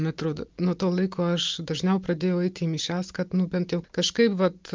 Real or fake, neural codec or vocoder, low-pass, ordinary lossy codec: real; none; 7.2 kHz; Opus, 24 kbps